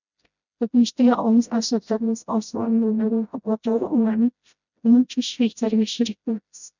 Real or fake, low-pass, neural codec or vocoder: fake; 7.2 kHz; codec, 16 kHz, 0.5 kbps, FreqCodec, smaller model